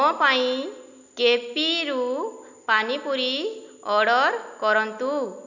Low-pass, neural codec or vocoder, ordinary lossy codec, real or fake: 7.2 kHz; none; none; real